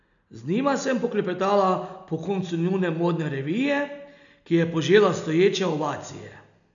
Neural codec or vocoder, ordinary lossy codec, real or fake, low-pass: none; none; real; 7.2 kHz